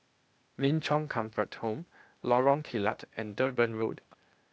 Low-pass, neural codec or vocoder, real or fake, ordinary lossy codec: none; codec, 16 kHz, 0.8 kbps, ZipCodec; fake; none